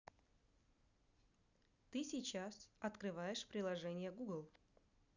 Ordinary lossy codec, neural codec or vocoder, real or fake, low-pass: none; none; real; 7.2 kHz